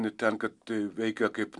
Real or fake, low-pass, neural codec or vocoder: real; 10.8 kHz; none